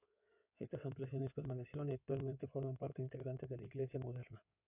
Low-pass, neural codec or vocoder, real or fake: 3.6 kHz; codec, 16 kHz in and 24 kHz out, 2.2 kbps, FireRedTTS-2 codec; fake